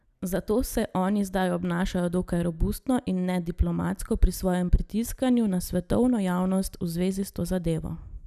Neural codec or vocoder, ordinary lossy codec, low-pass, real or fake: none; none; 14.4 kHz; real